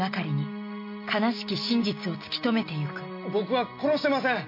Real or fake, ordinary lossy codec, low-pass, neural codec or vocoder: real; MP3, 32 kbps; 5.4 kHz; none